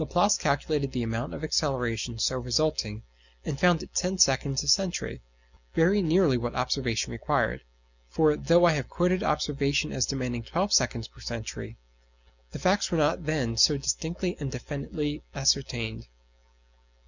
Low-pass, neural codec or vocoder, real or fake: 7.2 kHz; none; real